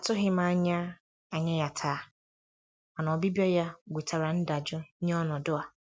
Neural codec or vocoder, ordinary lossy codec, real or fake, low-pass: none; none; real; none